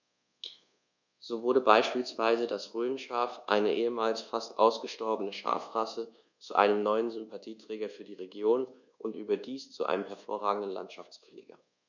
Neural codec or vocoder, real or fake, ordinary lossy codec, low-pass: codec, 24 kHz, 1.2 kbps, DualCodec; fake; none; 7.2 kHz